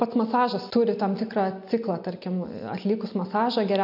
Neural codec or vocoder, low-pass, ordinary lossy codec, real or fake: none; 5.4 kHz; AAC, 48 kbps; real